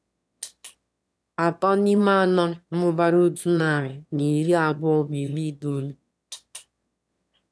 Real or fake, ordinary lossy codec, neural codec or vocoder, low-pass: fake; none; autoencoder, 22.05 kHz, a latent of 192 numbers a frame, VITS, trained on one speaker; none